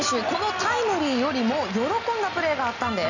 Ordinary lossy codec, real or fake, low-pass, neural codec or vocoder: none; real; 7.2 kHz; none